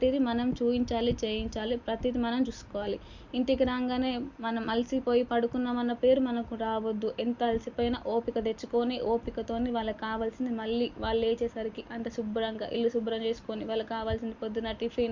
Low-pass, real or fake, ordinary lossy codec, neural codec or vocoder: 7.2 kHz; real; Opus, 64 kbps; none